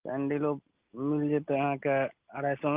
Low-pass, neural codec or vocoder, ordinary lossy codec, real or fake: 3.6 kHz; none; Opus, 24 kbps; real